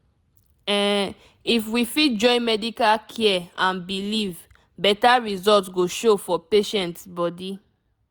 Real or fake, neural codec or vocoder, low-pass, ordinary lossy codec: real; none; none; none